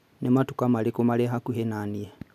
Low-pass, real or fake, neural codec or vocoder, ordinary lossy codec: 14.4 kHz; real; none; none